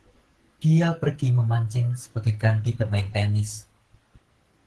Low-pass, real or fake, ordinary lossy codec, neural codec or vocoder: 10.8 kHz; fake; Opus, 16 kbps; codec, 44.1 kHz, 2.6 kbps, SNAC